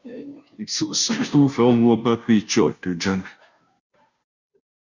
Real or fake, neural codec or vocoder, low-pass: fake; codec, 16 kHz, 0.5 kbps, FunCodec, trained on Chinese and English, 25 frames a second; 7.2 kHz